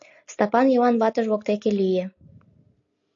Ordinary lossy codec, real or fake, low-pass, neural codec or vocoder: AAC, 48 kbps; real; 7.2 kHz; none